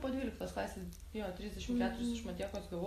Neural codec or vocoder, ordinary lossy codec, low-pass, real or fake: none; AAC, 48 kbps; 14.4 kHz; real